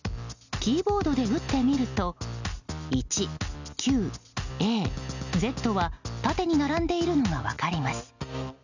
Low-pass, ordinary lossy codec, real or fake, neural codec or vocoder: 7.2 kHz; none; real; none